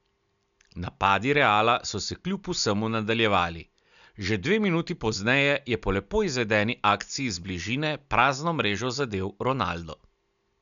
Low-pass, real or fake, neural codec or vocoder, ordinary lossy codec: 7.2 kHz; real; none; none